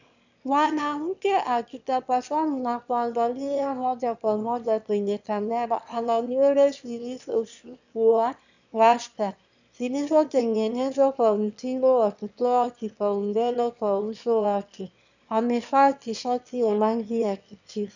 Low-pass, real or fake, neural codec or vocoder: 7.2 kHz; fake; autoencoder, 22.05 kHz, a latent of 192 numbers a frame, VITS, trained on one speaker